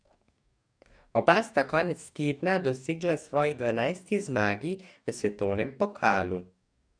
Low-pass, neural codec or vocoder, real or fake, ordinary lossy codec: 9.9 kHz; codec, 44.1 kHz, 2.6 kbps, DAC; fake; none